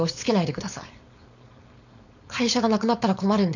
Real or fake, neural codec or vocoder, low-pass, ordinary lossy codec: fake; codec, 16 kHz, 4.8 kbps, FACodec; 7.2 kHz; none